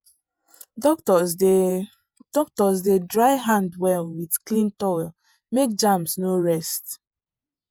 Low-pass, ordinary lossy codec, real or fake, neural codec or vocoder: none; none; fake; vocoder, 48 kHz, 128 mel bands, Vocos